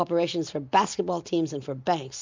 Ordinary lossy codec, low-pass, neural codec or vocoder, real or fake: MP3, 64 kbps; 7.2 kHz; none; real